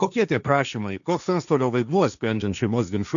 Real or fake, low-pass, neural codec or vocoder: fake; 7.2 kHz; codec, 16 kHz, 1.1 kbps, Voila-Tokenizer